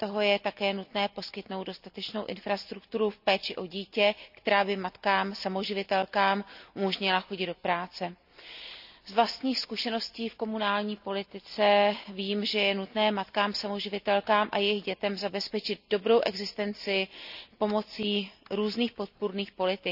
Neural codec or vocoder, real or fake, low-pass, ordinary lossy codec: none; real; 5.4 kHz; none